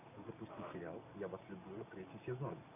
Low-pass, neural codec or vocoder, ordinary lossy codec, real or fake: 3.6 kHz; none; MP3, 24 kbps; real